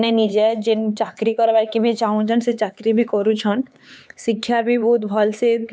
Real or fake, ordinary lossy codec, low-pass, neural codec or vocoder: fake; none; none; codec, 16 kHz, 4 kbps, X-Codec, HuBERT features, trained on LibriSpeech